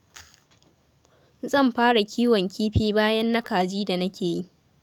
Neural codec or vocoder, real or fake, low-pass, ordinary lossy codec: codec, 44.1 kHz, 7.8 kbps, DAC; fake; 19.8 kHz; none